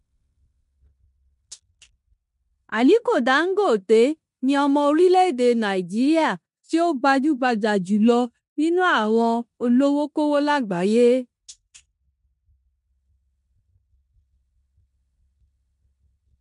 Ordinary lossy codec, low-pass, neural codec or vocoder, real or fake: MP3, 64 kbps; 10.8 kHz; codec, 16 kHz in and 24 kHz out, 0.9 kbps, LongCat-Audio-Codec, four codebook decoder; fake